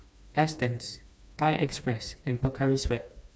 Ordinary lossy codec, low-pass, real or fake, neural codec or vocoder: none; none; fake; codec, 16 kHz, 2 kbps, FreqCodec, smaller model